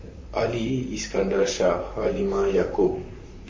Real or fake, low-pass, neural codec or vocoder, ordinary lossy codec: fake; 7.2 kHz; vocoder, 44.1 kHz, 128 mel bands, Pupu-Vocoder; MP3, 32 kbps